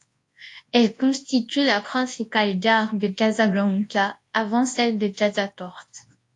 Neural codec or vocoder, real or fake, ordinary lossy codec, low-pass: codec, 24 kHz, 0.9 kbps, WavTokenizer, large speech release; fake; AAC, 48 kbps; 10.8 kHz